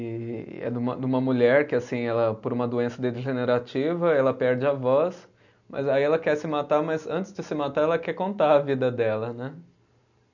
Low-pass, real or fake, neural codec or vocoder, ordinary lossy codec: 7.2 kHz; real; none; none